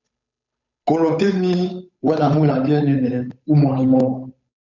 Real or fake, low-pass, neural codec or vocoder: fake; 7.2 kHz; codec, 16 kHz, 8 kbps, FunCodec, trained on Chinese and English, 25 frames a second